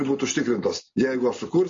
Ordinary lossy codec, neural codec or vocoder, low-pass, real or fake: MP3, 32 kbps; none; 7.2 kHz; real